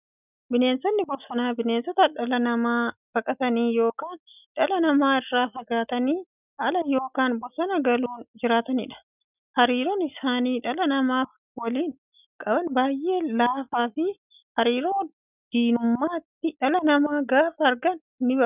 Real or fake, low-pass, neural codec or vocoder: real; 3.6 kHz; none